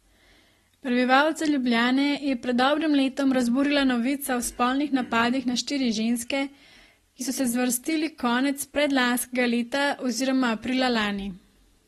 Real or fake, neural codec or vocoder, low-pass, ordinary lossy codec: real; none; 19.8 kHz; AAC, 32 kbps